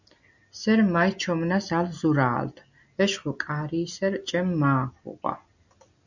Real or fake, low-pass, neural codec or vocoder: real; 7.2 kHz; none